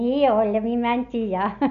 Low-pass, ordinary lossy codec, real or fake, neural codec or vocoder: 7.2 kHz; none; real; none